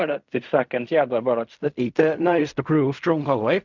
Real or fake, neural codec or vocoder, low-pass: fake; codec, 16 kHz in and 24 kHz out, 0.4 kbps, LongCat-Audio-Codec, fine tuned four codebook decoder; 7.2 kHz